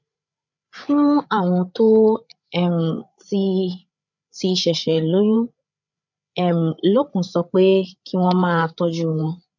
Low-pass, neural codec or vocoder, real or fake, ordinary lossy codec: 7.2 kHz; codec, 16 kHz, 8 kbps, FreqCodec, larger model; fake; none